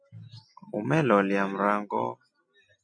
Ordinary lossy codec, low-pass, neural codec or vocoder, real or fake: MP3, 48 kbps; 9.9 kHz; none; real